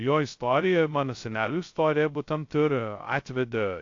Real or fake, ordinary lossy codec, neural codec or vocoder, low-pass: fake; AAC, 48 kbps; codec, 16 kHz, 0.2 kbps, FocalCodec; 7.2 kHz